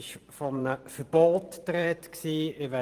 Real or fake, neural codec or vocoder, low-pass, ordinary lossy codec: fake; vocoder, 44.1 kHz, 128 mel bands every 512 samples, BigVGAN v2; 14.4 kHz; Opus, 24 kbps